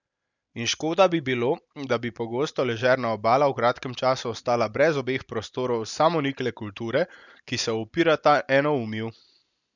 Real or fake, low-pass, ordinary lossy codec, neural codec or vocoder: real; 7.2 kHz; none; none